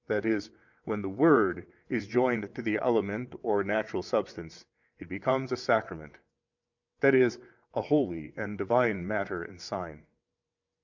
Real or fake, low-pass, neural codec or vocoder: fake; 7.2 kHz; vocoder, 22.05 kHz, 80 mel bands, WaveNeXt